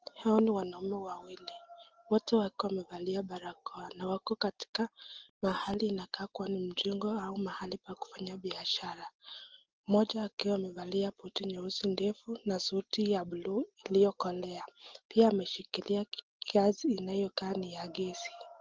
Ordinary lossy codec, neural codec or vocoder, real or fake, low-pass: Opus, 16 kbps; none; real; 7.2 kHz